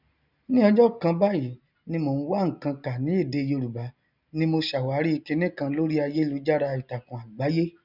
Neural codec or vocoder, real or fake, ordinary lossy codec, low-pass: none; real; none; 5.4 kHz